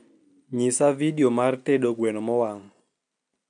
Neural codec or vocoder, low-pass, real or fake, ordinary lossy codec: none; 9.9 kHz; real; none